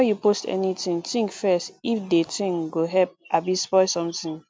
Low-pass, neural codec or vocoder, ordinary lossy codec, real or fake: none; none; none; real